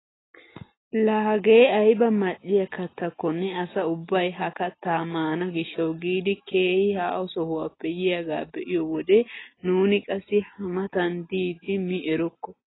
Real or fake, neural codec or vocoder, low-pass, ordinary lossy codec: real; none; 7.2 kHz; AAC, 16 kbps